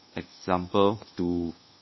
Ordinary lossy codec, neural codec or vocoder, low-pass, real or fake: MP3, 24 kbps; codec, 24 kHz, 1.2 kbps, DualCodec; 7.2 kHz; fake